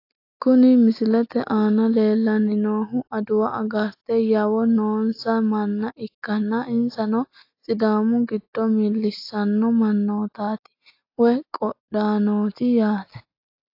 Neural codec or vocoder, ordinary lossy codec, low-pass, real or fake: none; AAC, 32 kbps; 5.4 kHz; real